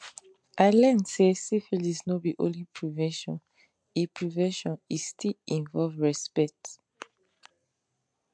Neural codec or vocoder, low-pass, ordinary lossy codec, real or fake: none; 9.9 kHz; MP3, 64 kbps; real